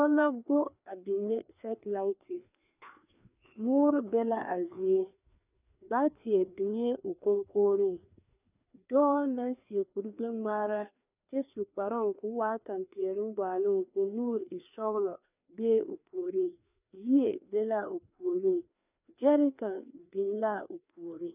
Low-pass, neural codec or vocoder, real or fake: 3.6 kHz; codec, 16 kHz, 2 kbps, FreqCodec, larger model; fake